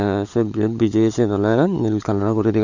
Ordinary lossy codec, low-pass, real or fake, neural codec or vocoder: none; 7.2 kHz; fake; codec, 16 kHz, 16 kbps, FunCodec, trained on LibriTTS, 50 frames a second